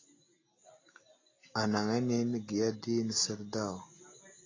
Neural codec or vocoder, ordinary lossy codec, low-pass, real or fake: none; AAC, 32 kbps; 7.2 kHz; real